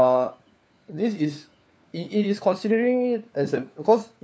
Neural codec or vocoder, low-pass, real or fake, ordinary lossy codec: codec, 16 kHz, 4 kbps, FunCodec, trained on LibriTTS, 50 frames a second; none; fake; none